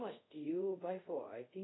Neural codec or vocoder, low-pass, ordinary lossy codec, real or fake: codec, 24 kHz, 0.5 kbps, DualCodec; 7.2 kHz; AAC, 16 kbps; fake